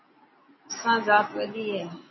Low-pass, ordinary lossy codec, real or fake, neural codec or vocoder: 7.2 kHz; MP3, 24 kbps; real; none